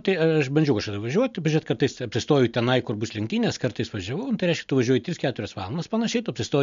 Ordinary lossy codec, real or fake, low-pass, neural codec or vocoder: MP3, 64 kbps; real; 7.2 kHz; none